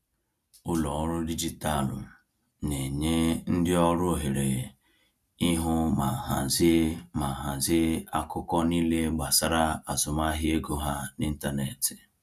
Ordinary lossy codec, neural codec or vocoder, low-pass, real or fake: none; none; 14.4 kHz; real